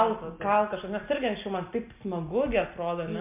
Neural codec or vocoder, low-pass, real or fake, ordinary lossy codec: none; 3.6 kHz; real; MP3, 32 kbps